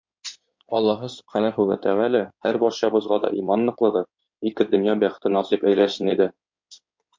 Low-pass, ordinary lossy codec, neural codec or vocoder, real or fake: 7.2 kHz; MP3, 48 kbps; codec, 16 kHz in and 24 kHz out, 2.2 kbps, FireRedTTS-2 codec; fake